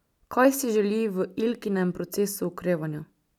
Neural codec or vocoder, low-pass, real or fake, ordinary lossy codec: none; 19.8 kHz; real; none